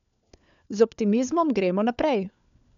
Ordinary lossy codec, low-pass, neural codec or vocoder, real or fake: none; 7.2 kHz; codec, 16 kHz, 4 kbps, FunCodec, trained on LibriTTS, 50 frames a second; fake